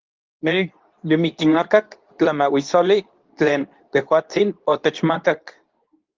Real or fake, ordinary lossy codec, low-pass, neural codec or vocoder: fake; Opus, 32 kbps; 7.2 kHz; codec, 24 kHz, 0.9 kbps, WavTokenizer, medium speech release version 2